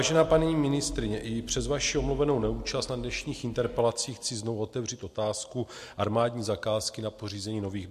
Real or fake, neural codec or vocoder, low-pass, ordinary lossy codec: real; none; 14.4 kHz; MP3, 64 kbps